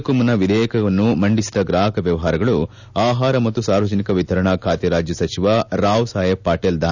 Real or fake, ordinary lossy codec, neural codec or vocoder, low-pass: real; none; none; 7.2 kHz